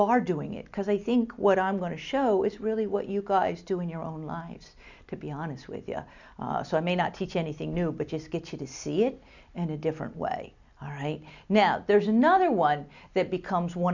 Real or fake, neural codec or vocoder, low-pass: real; none; 7.2 kHz